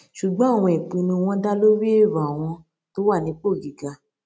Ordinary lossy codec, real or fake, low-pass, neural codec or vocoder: none; real; none; none